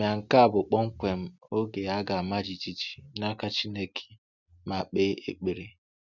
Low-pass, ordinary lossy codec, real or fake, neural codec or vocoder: 7.2 kHz; none; real; none